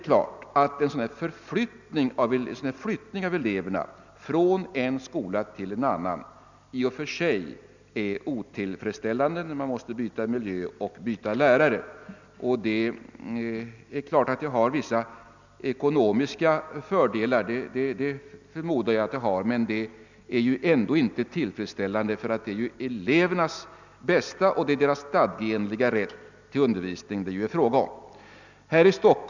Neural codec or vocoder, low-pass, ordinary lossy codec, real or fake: none; 7.2 kHz; none; real